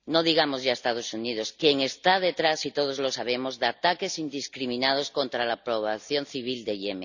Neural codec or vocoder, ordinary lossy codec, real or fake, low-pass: none; none; real; 7.2 kHz